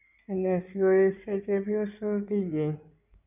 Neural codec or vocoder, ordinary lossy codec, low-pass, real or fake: codec, 16 kHz in and 24 kHz out, 2.2 kbps, FireRedTTS-2 codec; none; 3.6 kHz; fake